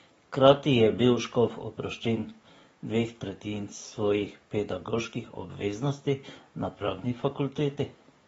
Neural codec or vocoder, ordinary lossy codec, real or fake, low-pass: codec, 44.1 kHz, 7.8 kbps, DAC; AAC, 24 kbps; fake; 19.8 kHz